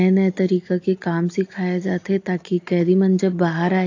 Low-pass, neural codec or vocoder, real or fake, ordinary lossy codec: 7.2 kHz; none; real; AAC, 48 kbps